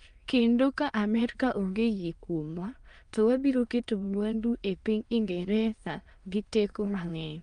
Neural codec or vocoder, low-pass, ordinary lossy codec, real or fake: autoencoder, 22.05 kHz, a latent of 192 numbers a frame, VITS, trained on many speakers; 9.9 kHz; Opus, 32 kbps; fake